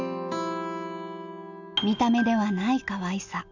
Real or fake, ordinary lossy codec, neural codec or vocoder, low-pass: real; none; none; 7.2 kHz